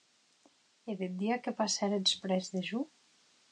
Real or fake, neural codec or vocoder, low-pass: real; none; 9.9 kHz